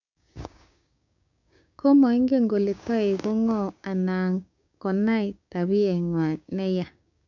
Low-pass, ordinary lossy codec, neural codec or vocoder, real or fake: 7.2 kHz; none; codec, 16 kHz, 6 kbps, DAC; fake